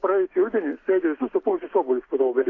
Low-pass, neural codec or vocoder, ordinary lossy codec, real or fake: 7.2 kHz; none; AAC, 32 kbps; real